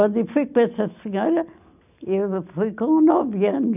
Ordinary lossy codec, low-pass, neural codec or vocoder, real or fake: none; 3.6 kHz; none; real